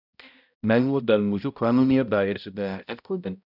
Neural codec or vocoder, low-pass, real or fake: codec, 16 kHz, 0.5 kbps, X-Codec, HuBERT features, trained on balanced general audio; 5.4 kHz; fake